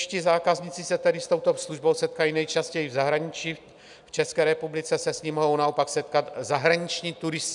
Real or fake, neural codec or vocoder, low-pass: real; none; 10.8 kHz